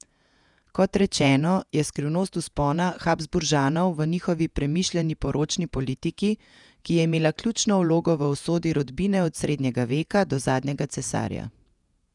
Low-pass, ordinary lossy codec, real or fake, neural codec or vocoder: 10.8 kHz; none; fake; vocoder, 48 kHz, 128 mel bands, Vocos